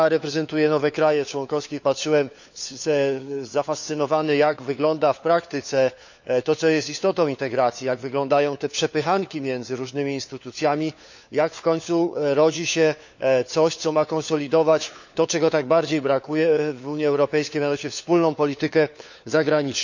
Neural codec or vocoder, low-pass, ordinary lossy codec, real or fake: codec, 16 kHz, 4 kbps, FunCodec, trained on LibriTTS, 50 frames a second; 7.2 kHz; none; fake